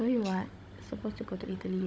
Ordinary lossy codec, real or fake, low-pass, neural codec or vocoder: none; fake; none; codec, 16 kHz, 16 kbps, FunCodec, trained on Chinese and English, 50 frames a second